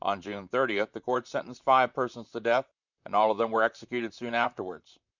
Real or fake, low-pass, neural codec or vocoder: fake; 7.2 kHz; vocoder, 44.1 kHz, 128 mel bands, Pupu-Vocoder